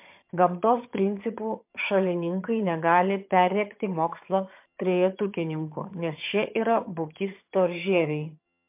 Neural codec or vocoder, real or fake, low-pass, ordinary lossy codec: vocoder, 22.05 kHz, 80 mel bands, HiFi-GAN; fake; 3.6 kHz; MP3, 32 kbps